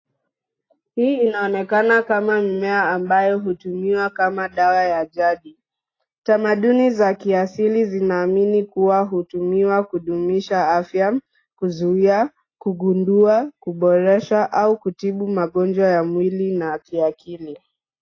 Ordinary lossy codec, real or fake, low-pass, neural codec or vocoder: AAC, 32 kbps; real; 7.2 kHz; none